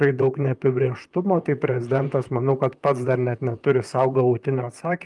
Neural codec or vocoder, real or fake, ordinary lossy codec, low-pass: vocoder, 44.1 kHz, 128 mel bands, Pupu-Vocoder; fake; Opus, 32 kbps; 10.8 kHz